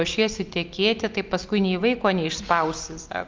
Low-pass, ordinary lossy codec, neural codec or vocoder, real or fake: 7.2 kHz; Opus, 32 kbps; none; real